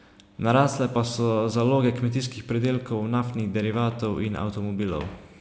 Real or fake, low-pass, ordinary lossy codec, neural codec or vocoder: real; none; none; none